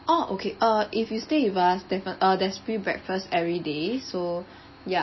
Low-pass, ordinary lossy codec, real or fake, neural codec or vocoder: 7.2 kHz; MP3, 24 kbps; real; none